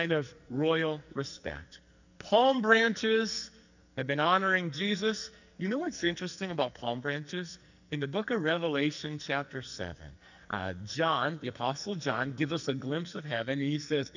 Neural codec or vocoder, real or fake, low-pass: codec, 44.1 kHz, 2.6 kbps, SNAC; fake; 7.2 kHz